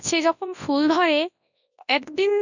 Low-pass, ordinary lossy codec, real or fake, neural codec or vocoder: 7.2 kHz; none; fake; codec, 24 kHz, 0.9 kbps, WavTokenizer, large speech release